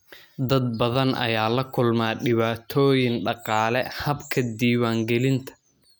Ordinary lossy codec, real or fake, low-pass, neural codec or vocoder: none; real; none; none